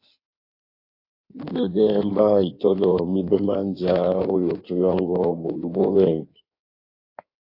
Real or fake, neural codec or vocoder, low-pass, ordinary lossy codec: fake; codec, 16 kHz in and 24 kHz out, 1.1 kbps, FireRedTTS-2 codec; 5.4 kHz; AAC, 32 kbps